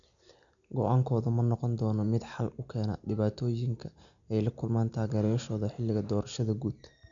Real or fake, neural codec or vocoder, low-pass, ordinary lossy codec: real; none; 7.2 kHz; MP3, 96 kbps